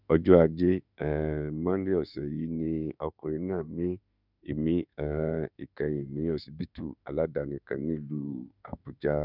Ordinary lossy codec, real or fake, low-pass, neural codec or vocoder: none; fake; 5.4 kHz; autoencoder, 48 kHz, 32 numbers a frame, DAC-VAE, trained on Japanese speech